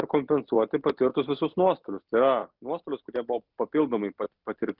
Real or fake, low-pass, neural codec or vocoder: real; 5.4 kHz; none